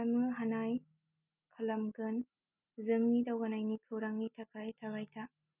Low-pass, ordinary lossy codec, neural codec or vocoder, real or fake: 3.6 kHz; none; none; real